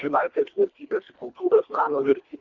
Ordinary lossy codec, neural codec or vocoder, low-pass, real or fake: Opus, 64 kbps; codec, 24 kHz, 1.5 kbps, HILCodec; 7.2 kHz; fake